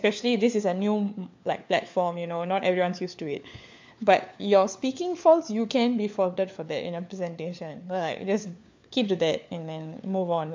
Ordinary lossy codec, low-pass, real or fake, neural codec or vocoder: MP3, 64 kbps; 7.2 kHz; fake; codec, 16 kHz, 4 kbps, FunCodec, trained on LibriTTS, 50 frames a second